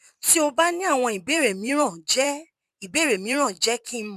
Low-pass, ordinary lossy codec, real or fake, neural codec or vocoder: 14.4 kHz; none; fake; vocoder, 44.1 kHz, 128 mel bands every 512 samples, BigVGAN v2